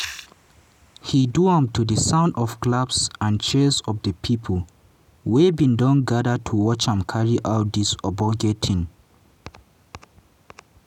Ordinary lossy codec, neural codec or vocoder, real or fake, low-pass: none; none; real; 19.8 kHz